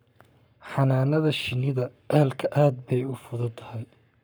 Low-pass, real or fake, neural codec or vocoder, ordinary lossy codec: none; fake; codec, 44.1 kHz, 7.8 kbps, Pupu-Codec; none